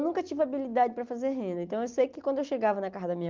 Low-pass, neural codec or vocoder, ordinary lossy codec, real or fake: 7.2 kHz; none; Opus, 32 kbps; real